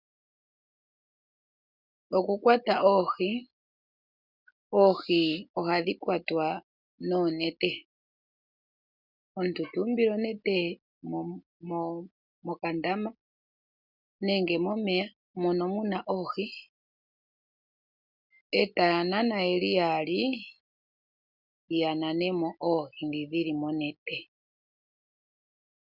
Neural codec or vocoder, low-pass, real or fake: none; 5.4 kHz; real